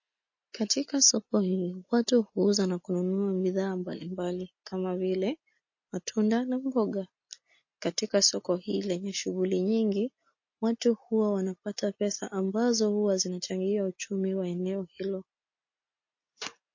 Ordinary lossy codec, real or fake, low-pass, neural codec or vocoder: MP3, 32 kbps; real; 7.2 kHz; none